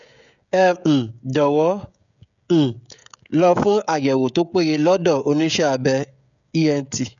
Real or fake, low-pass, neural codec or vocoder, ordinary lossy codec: fake; 7.2 kHz; codec, 16 kHz, 16 kbps, FreqCodec, smaller model; none